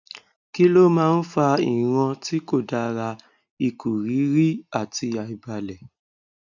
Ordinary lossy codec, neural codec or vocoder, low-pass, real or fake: none; none; 7.2 kHz; real